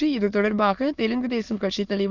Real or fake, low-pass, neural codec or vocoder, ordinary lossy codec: fake; 7.2 kHz; autoencoder, 22.05 kHz, a latent of 192 numbers a frame, VITS, trained on many speakers; none